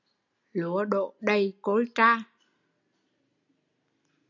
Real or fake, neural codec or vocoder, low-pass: real; none; 7.2 kHz